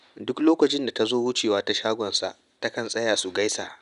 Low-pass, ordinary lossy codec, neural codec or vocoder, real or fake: 10.8 kHz; none; none; real